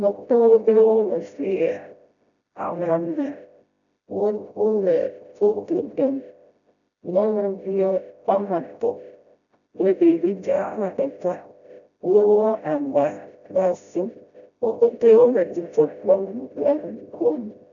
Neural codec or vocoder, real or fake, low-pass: codec, 16 kHz, 0.5 kbps, FreqCodec, smaller model; fake; 7.2 kHz